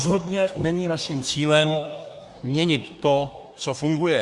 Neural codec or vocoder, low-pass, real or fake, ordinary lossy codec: codec, 24 kHz, 1 kbps, SNAC; 10.8 kHz; fake; Opus, 64 kbps